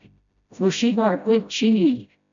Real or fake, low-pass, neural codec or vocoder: fake; 7.2 kHz; codec, 16 kHz, 0.5 kbps, FreqCodec, smaller model